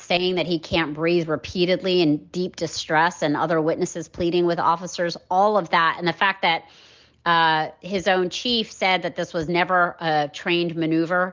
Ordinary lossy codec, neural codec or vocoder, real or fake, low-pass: Opus, 24 kbps; none; real; 7.2 kHz